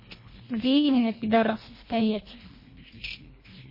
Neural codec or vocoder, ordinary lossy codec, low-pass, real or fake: codec, 24 kHz, 1.5 kbps, HILCodec; MP3, 24 kbps; 5.4 kHz; fake